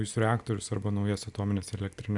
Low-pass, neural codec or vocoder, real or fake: 10.8 kHz; none; real